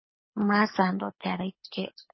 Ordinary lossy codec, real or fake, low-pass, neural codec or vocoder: MP3, 24 kbps; fake; 7.2 kHz; codec, 24 kHz, 6 kbps, HILCodec